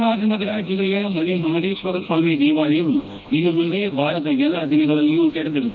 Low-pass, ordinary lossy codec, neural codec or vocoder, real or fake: 7.2 kHz; none; codec, 16 kHz, 1 kbps, FreqCodec, smaller model; fake